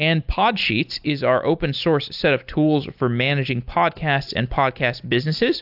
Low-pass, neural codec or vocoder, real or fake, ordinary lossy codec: 5.4 kHz; none; real; AAC, 48 kbps